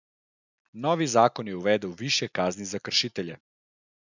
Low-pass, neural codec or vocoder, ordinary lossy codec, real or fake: 7.2 kHz; none; none; real